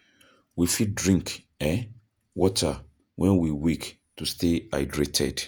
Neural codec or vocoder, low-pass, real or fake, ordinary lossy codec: vocoder, 48 kHz, 128 mel bands, Vocos; none; fake; none